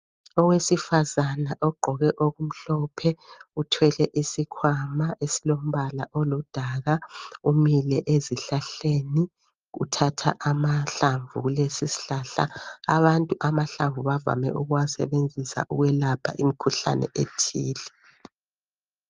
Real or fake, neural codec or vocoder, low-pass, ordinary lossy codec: real; none; 7.2 kHz; Opus, 32 kbps